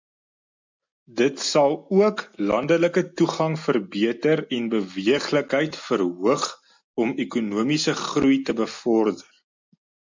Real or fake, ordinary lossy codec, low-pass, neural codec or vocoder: real; MP3, 64 kbps; 7.2 kHz; none